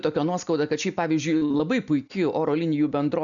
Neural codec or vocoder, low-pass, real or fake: none; 7.2 kHz; real